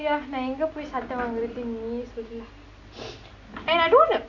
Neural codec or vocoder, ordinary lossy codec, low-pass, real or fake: none; none; 7.2 kHz; real